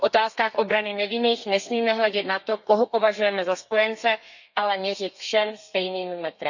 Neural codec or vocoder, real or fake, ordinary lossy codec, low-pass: codec, 32 kHz, 1.9 kbps, SNAC; fake; none; 7.2 kHz